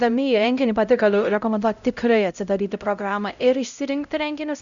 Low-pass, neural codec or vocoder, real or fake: 7.2 kHz; codec, 16 kHz, 0.5 kbps, X-Codec, HuBERT features, trained on LibriSpeech; fake